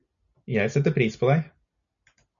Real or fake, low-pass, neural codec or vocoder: real; 7.2 kHz; none